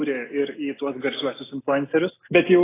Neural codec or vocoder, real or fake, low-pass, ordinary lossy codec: none; real; 3.6 kHz; AAC, 16 kbps